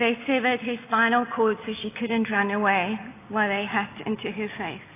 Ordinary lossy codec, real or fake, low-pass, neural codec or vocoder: AAC, 24 kbps; real; 3.6 kHz; none